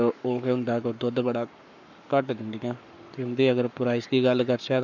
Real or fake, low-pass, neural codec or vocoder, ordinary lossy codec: fake; 7.2 kHz; codec, 44.1 kHz, 7.8 kbps, Pupu-Codec; none